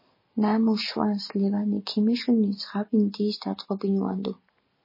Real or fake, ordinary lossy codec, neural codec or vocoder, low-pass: real; MP3, 24 kbps; none; 5.4 kHz